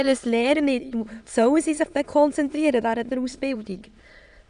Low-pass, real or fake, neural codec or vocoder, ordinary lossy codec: 9.9 kHz; fake; autoencoder, 22.05 kHz, a latent of 192 numbers a frame, VITS, trained on many speakers; none